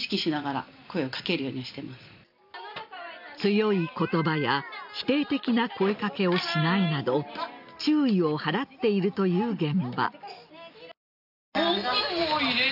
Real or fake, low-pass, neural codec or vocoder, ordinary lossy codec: real; 5.4 kHz; none; none